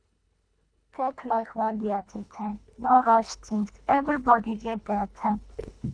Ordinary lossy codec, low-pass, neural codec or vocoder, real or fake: none; 9.9 kHz; codec, 24 kHz, 1.5 kbps, HILCodec; fake